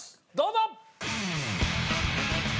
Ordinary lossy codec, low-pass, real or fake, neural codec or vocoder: none; none; real; none